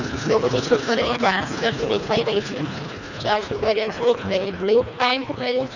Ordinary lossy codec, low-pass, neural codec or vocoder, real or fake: none; 7.2 kHz; codec, 24 kHz, 1.5 kbps, HILCodec; fake